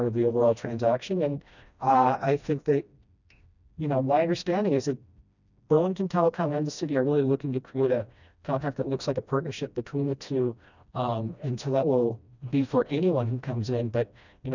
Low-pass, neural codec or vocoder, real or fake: 7.2 kHz; codec, 16 kHz, 1 kbps, FreqCodec, smaller model; fake